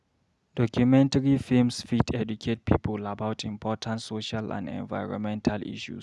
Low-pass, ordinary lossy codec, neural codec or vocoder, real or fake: none; none; none; real